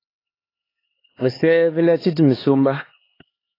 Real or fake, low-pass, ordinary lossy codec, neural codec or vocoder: fake; 5.4 kHz; AAC, 24 kbps; codec, 16 kHz, 4 kbps, X-Codec, HuBERT features, trained on LibriSpeech